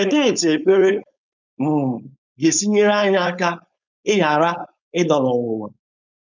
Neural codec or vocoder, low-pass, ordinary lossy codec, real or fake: codec, 16 kHz, 4.8 kbps, FACodec; 7.2 kHz; none; fake